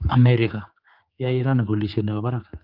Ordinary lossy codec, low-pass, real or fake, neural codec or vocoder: Opus, 32 kbps; 5.4 kHz; fake; codec, 16 kHz, 4 kbps, X-Codec, HuBERT features, trained on general audio